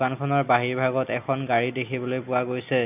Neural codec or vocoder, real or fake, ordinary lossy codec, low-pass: none; real; none; 3.6 kHz